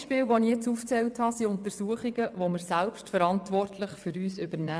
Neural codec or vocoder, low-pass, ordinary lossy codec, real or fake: vocoder, 22.05 kHz, 80 mel bands, WaveNeXt; none; none; fake